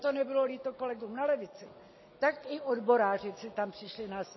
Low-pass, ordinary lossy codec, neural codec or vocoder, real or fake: 7.2 kHz; MP3, 24 kbps; none; real